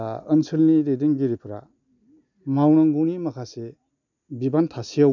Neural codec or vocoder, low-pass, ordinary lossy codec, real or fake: none; 7.2 kHz; none; real